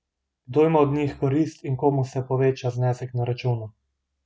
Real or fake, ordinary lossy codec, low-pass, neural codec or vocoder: real; none; none; none